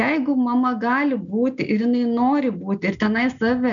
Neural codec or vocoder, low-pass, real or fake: none; 7.2 kHz; real